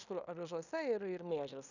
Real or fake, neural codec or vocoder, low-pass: fake; codec, 16 kHz in and 24 kHz out, 0.9 kbps, LongCat-Audio-Codec, fine tuned four codebook decoder; 7.2 kHz